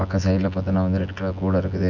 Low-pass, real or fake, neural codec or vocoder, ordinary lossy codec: 7.2 kHz; fake; vocoder, 24 kHz, 100 mel bands, Vocos; none